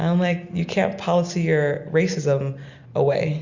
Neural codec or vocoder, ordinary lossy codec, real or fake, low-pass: none; Opus, 64 kbps; real; 7.2 kHz